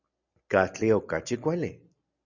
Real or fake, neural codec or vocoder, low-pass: real; none; 7.2 kHz